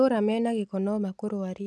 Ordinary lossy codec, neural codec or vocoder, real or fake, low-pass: none; none; real; none